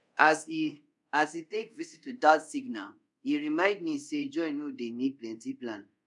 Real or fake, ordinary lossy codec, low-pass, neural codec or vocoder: fake; none; 10.8 kHz; codec, 24 kHz, 0.5 kbps, DualCodec